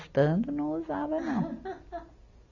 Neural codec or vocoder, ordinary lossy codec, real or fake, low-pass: none; none; real; 7.2 kHz